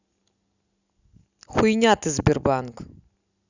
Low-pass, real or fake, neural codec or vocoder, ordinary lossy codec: 7.2 kHz; real; none; none